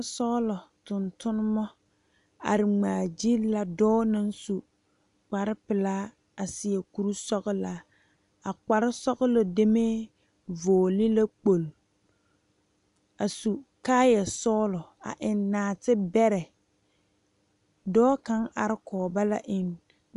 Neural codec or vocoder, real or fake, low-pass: none; real; 10.8 kHz